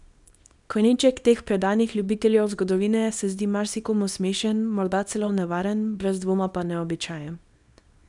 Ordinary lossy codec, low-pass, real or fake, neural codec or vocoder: none; 10.8 kHz; fake; codec, 24 kHz, 0.9 kbps, WavTokenizer, small release